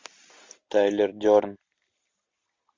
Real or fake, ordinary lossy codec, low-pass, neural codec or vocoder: real; MP3, 48 kbps; 7.2 kHz; none